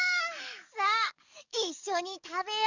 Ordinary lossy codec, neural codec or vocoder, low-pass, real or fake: none; codec, 44.1 kHz, 7.8 kbps, DAC; 7.2 kHz; fake